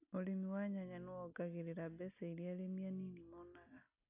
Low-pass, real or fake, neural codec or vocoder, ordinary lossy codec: 3.6 kHz; real; none; AAC, 24 kbps